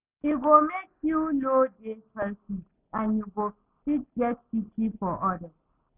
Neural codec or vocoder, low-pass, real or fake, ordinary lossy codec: none; 3.6 kHz; real; none